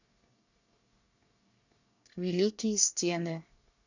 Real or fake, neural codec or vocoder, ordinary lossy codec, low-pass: fake; codec, 24 kHz, 1 kbps, SNAC; none; 7.2 kHz